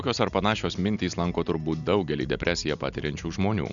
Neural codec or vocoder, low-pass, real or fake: none; 7.2 kHz; real